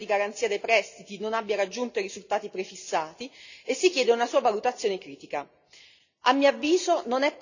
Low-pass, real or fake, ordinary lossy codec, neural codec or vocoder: 7.2 kHz; real; none; none